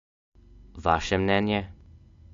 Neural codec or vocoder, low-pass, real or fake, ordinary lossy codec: none; 7.2 kHz; real; MP3, 48 kbps